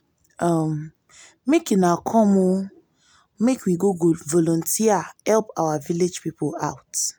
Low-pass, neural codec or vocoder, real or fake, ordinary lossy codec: none; none; real; none